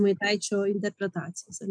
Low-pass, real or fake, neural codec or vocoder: 9.9 kHz; real; none